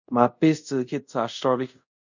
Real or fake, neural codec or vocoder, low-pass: fake; codec, 24 kHz, 0.5 kbps, DualCodec; 7.2 kHz